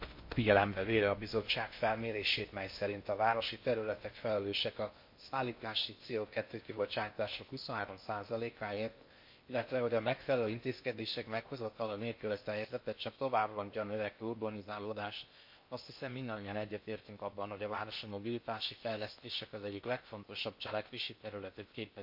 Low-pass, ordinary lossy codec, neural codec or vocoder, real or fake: 5.4 kHz; MP3, 32 kbps; codec, 16 kHz in and 24 kHz out, 0.6 kbps, FocalCodec, streaming, 4096 codes; fake